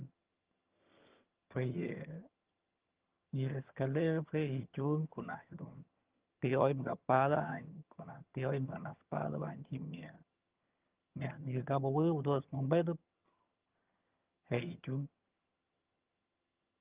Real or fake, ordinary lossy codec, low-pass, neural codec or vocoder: fake; Opus, 32 kbps; 3.6 kHz; vocoder, 22.05 kHz, 80 mel bands, HiFi-GAN